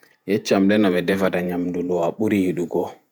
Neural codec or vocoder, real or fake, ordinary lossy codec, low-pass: vocoder, 48 kHz, 128 mel bands, Vocos; fake; none; none